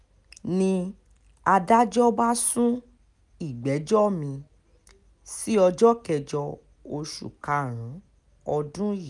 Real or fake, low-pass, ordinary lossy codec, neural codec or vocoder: real; 10.8 kHz; none; none